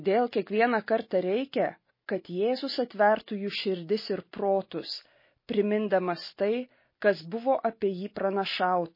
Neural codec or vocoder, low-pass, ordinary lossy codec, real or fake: none; 5.4 kHz; MP3, 24 kbps; real